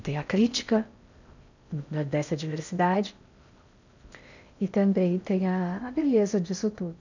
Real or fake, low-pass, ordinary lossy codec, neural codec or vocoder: fake; 7.2 kHz; none; codec, 16 kHz in and 24 kHz out, 0.6 kbps, FocalCodec, streaming, 4096 codes